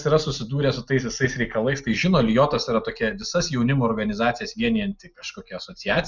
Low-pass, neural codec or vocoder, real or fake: 7.2 kHz; none; real